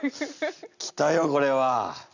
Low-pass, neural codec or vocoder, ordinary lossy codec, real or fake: 7.2 kHz; none; none; real